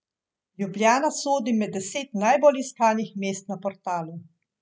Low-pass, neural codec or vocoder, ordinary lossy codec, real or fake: none; none; none; real